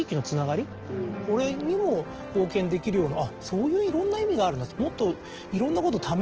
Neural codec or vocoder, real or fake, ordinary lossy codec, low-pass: none; real; Opus, 16 kbps; 7.2 kHz